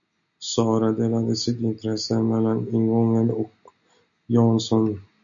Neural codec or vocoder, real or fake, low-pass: none; real; 7.2 kHz